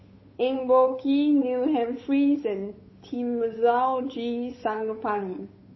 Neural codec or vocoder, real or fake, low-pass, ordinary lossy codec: codec, 16 kHz, 8 kbps, FunCodec, trained on Chinese and English, 25 frames a second; fake; 7.2 kHz; MP3, 24 kbps